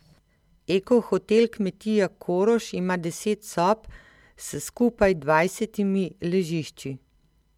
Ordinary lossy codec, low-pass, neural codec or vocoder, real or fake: MP3, 96 kbps; 19.8 kHz; none; real